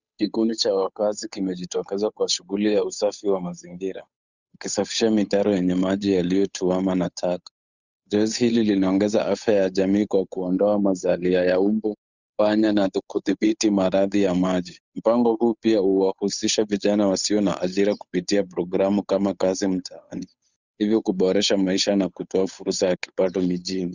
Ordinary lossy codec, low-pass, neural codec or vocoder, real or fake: Opus, 64 kbps; 7.2 kHz; codec, 16 kHz, 8 kbps, FunCodec, trained on Chinese and English, 25 frames a second; fake